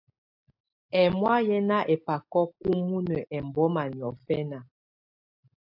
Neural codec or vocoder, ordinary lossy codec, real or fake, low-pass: none; AAC, 48 kbps; real; 5.4 kHz